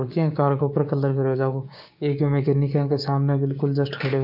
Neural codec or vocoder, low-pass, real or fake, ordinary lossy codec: codec, 16 kHz, 6 kbps, DAC; 5.4 kHz; fake; MP3, 48 kbps